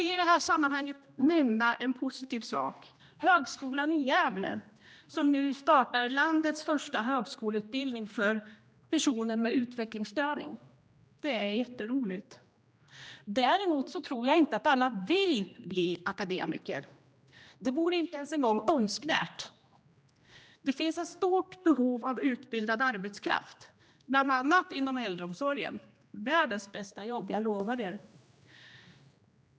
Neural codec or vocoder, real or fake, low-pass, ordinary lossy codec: codec, 16 kHz, 1 kbps, X-Codec, HuBERT features, trained on general audio; fake; none; none